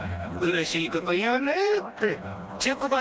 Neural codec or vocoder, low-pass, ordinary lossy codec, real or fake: codec, 16 kHz, 1 kbps, FreqCodec, smaller model; none; none; fake